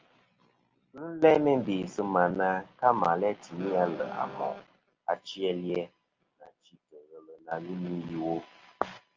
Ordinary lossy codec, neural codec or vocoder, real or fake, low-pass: Opus, 32 kbps; none; real; 7.2 kHz